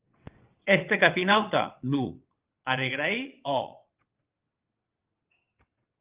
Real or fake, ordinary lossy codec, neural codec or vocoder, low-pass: fake; Opus, 32 kbps; vocoder, 22.05 kHz, 80 mel bands, WaveNeXt; 3.6 kHz